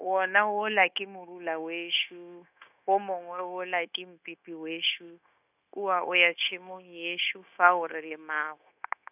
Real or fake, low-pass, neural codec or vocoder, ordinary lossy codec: fake; 3.6 kHz; codec, 16 kHz, 0.9 kbps, LongCat-Audio-Codec; none